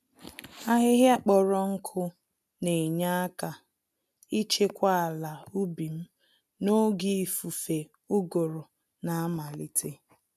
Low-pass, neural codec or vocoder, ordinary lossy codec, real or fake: 14.4 kHz; none; none; real